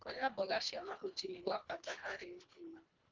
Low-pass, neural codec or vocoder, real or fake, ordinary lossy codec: 7.2 kHz; codec, 24 kHz, 1.5 kbps, HILCodec; fake; Opus, 32 kbps